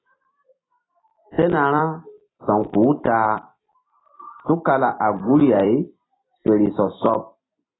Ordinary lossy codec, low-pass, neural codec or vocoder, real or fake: AAC, 16 kbps; 7.2 kHz; vocoder, 44.1 kHz, 128 mel bands every 256 samples, BigVGAN v2; fake